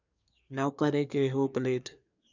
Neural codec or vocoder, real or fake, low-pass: codec, 24 kHz, 1 kbps, SNAC; fake; 7.2 kHz